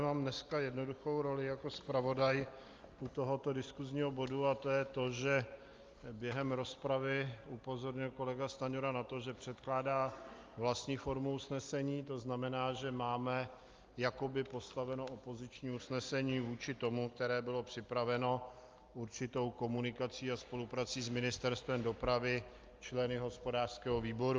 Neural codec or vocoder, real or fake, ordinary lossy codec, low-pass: none; real; Opus, 32 kbps; 7.2 kHz